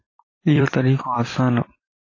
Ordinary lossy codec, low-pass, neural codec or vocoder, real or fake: AAC, 32 kbps; 7.2 kHz; vocoder, 44.1 kHz, 128 mel bands every 256 samples, BigVGAN v2; fake